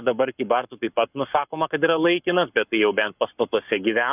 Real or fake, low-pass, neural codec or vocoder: fake; 3.6 kHz; codec, 44.1 kHz, 7.8 kbps, Pupu-Codec